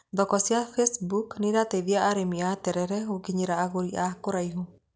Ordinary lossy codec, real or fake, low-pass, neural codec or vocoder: none; real; none; none